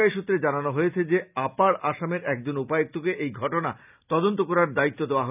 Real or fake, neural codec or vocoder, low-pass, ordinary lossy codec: real; none; 3.6 kHz; none